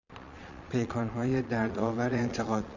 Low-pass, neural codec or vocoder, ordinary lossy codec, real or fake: 7.2 kHz; vocoder, 22.05 kHz, 80 mel bands, Vocos; Opus, 64 kbps; fake